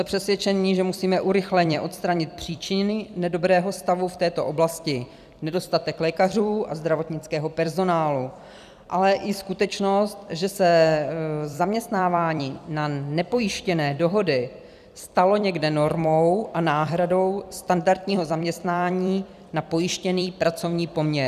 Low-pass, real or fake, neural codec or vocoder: 14.4 kHz; fake; vocoder, 44.1 kHz, 128 mel bands every 256 samples, BigVGAN v2